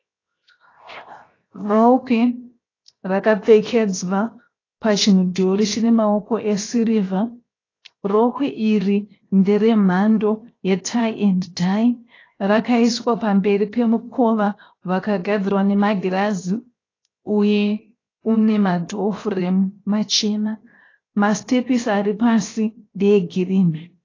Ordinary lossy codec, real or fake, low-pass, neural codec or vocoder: AAC, 32 kbps; fake; 7.2 kHz; codec, 16 kHz, 0.7 kbps, FocalCodec